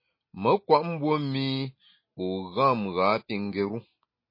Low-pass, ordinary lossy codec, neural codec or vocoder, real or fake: 5.4 kHz; MP3, 24 kbps; none; real